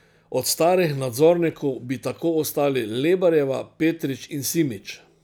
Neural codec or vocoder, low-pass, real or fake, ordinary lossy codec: none; none; real; none